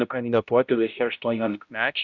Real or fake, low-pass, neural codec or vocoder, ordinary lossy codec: fake; 7.2 kHz; codec, 16 kHz, 0.5 kbps, X-Codec, HuBERT features, trained on balanced general audio; Opus, 64 kbps